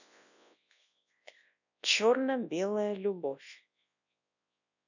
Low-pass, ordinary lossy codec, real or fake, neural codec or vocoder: 7.2 kHz; none; fake; codec, 24 kHz, 0.9 kbps, WavTokenizer, large speech release